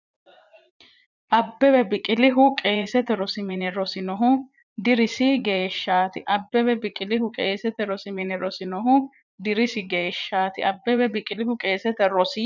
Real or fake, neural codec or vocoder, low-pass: fake; vocoder, 44.1 kHz, 80 mel bands, Vocos; 7.2 kHz